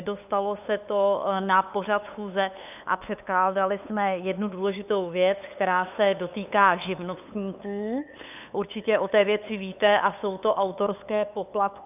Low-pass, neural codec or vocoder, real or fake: 3.6 kHz; codec, 16 kHz, 8 kbps, FunCodec, trained on LibriTTS, 25 frames a second; fake